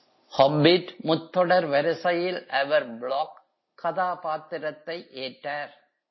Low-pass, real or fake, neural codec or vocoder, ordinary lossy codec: 7.2 kHz; real; none; MP3, 24 kbps